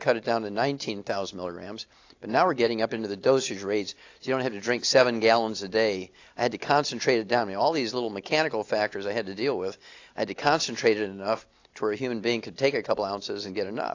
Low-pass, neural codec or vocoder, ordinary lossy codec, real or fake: 7.2 kHz; none; AAC, 48 kbps; real